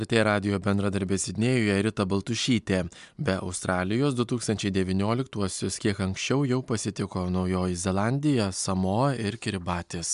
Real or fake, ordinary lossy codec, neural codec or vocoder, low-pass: real; MP3, 96 kbps; none; 10.8 kHz